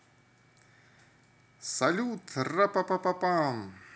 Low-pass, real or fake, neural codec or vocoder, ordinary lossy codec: none; real; none; none